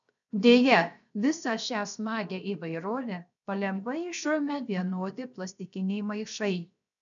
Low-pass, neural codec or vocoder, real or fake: 7.2 kHz; codec, 16 kHz, 0.7 kbps, FocalCodec; fake